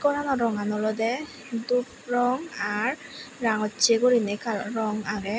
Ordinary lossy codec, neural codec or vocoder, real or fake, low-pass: none; none; real; none